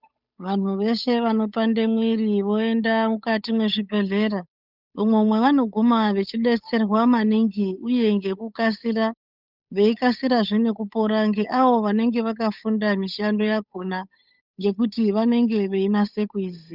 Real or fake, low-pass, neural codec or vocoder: fake; 5.4 kHz; codec, 16 kHz, 8 kbps, FunCodec, trained on Chinese and English, 25 frames a second